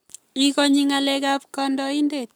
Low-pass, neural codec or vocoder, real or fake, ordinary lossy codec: none; codec, 44.1 kHz, 7.8 kbps, Pupu-Codec; fake; none